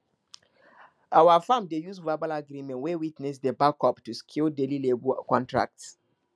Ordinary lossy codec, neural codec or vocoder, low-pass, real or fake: none; none; none; real